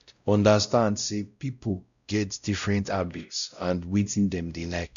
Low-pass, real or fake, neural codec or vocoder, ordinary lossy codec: 7.2 kHz; fake; codec, 16 kHz, 0.5 kbps, X-Codec, WavLM features, trained on Multilingual LibriSpeech; none